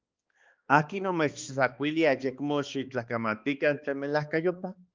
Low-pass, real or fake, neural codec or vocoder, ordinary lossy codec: 7.2 kHz; fake; codec, 16 kHz, 2 kbps, X-Codec, HuBERT features, trained on balanced general audio; Opus, 24 kbps